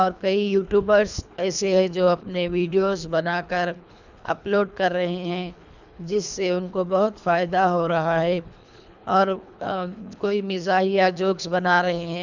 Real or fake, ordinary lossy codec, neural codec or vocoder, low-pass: fake; none; codec, 24 kHz, 3 kbps, HILCodec; 7.2 kHz